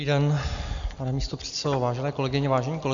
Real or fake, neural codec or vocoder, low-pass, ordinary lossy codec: real; none; 7.2 kHz; AAC, 64 kbps